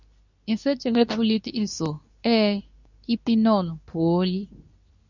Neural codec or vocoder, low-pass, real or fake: codec, 24 kHz, 0.9 kbps, WavTokenizer, medium speech release version 2; 7.2 kHz; fake